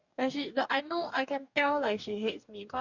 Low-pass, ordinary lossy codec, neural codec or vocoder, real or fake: 7.2 kHz; none; codec, 44.1 kHz, 2.6 kbps, DAC; fake